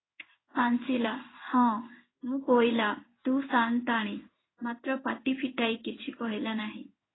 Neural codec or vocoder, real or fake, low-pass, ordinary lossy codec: codec, 16 kHz in and 24 kHz out, 1 kbps, XY-Tokenizer; fake; 7.2 kHz; AAC, 16 kbps